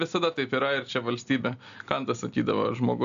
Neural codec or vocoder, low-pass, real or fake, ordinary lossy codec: none; 7.2 kHz; real; AAC, 96 kbps